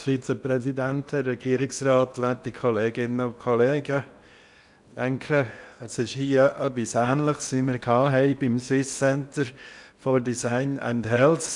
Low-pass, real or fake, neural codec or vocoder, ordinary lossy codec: 10.8 kHz; fake; codec, 16 kHz in and 24 kHz out, 0.8 kbps, FocalCodec, streaming, 65536 codes; none